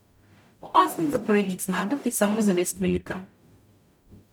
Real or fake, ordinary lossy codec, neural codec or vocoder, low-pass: fake; none; codec, 44.1 kHz, 0.9 kbps, DAC; none